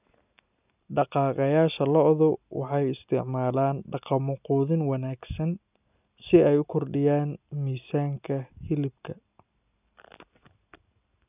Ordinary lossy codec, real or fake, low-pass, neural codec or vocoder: none; real; 3.6 kHz; none